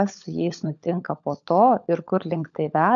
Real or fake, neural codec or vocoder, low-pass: fake; codec, 16 kHz, 16 kbps, FunCodec, trained on LibriTTS, 50 frames a second; 7.2 kHz